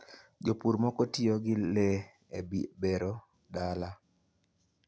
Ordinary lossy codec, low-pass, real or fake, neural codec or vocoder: none; none; real; none